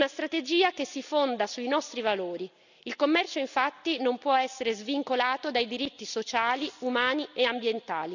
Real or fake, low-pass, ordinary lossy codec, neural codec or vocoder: real; 7.2 kHz; none; none